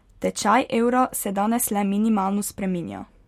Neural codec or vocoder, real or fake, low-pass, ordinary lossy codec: none; real; 19.8 kHz; MP3, 64 kbps